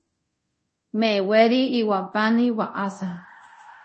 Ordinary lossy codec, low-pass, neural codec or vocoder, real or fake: MP3, 32 kbps; 10.8 kHz; codec, 24 kHz, 0.5 kbps, DualCodec; fake